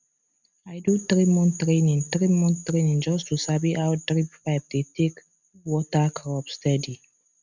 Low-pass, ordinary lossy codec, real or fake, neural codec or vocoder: 7.2 kHz; none; real; none